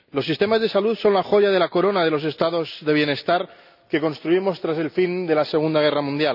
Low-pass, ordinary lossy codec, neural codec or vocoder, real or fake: 5.4 kHz; none; none; real